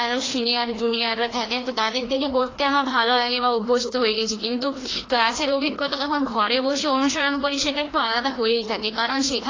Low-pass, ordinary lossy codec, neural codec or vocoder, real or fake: 7.2 kHz; AAC, 32 kbps; codec, 16 kHz, 1 kbps, FreqCodec, larger model; fake